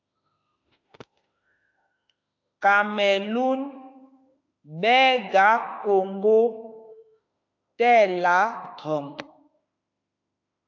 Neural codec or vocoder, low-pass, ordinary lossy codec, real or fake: autoencoder, 48 kHz, 32 numbers a frame, DAC-VAE, trained on Japanese speech; 7.2 kHz; AAC, 48 kbps; fake